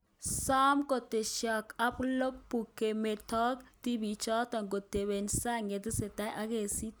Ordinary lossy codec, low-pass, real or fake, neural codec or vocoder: none; none; real; none